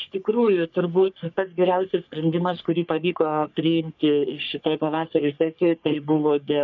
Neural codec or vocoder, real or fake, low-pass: codec, 44.1 kHz, 3.4 kbps, Pupu-Codec; fake; 7.2 kHz